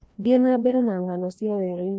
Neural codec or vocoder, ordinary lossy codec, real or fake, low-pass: codec, 16 kHz, 1 kbps, FreqCodec, larger model; none; fake; none